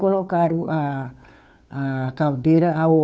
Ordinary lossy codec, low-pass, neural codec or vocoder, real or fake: none; none; codec, 16 kHz, 2 kbps, FunCodec, trained on Chinese and English, 25 frames a second; fake